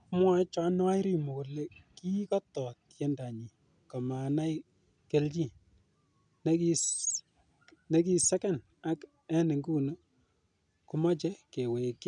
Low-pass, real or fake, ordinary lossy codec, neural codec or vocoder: 9.9 kHz; real; none; none